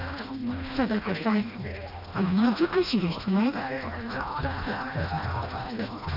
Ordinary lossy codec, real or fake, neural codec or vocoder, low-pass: none; fake; codec, 16 kHz, 1 kbps, FreqCodec, smaller model; 5.4 kHz